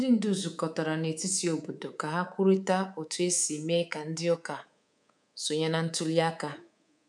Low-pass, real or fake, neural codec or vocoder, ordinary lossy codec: none; fake; codec, 24 kHz, 3.1 kbps, DualCodec; none